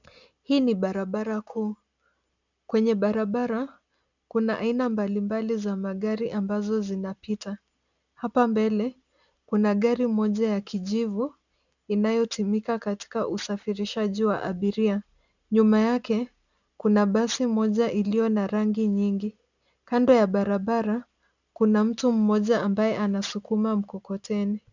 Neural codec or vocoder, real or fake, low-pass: none; real; 7.2 kHz